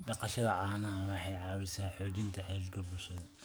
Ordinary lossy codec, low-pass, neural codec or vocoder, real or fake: none; none; codec, 44.1 kHz, 7.8 kbps, DAC; fake